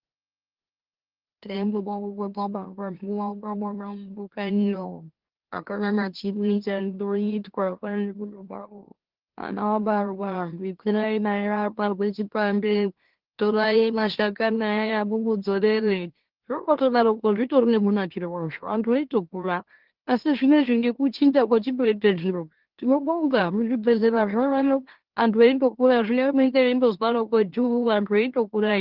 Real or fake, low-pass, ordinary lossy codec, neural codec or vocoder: fake; 5.4 kHz; Opus, 16 kbps; autoencoder, 44.1 kHz, a latent of 192 numbers a frame, MeloTTS